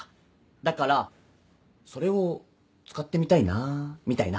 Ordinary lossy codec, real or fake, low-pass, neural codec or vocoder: none; real; none; none